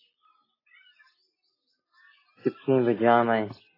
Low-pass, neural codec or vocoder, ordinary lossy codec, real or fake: 5.4 kHz; none; AAC, 24 kbps; real